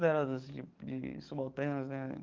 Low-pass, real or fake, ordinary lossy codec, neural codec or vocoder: 7.2 kHz; fake; Opus, 24 kbps; codec, 44.1 kHz, 7.8 kbps, DAC